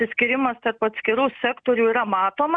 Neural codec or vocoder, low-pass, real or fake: none; 9.9 kHz; real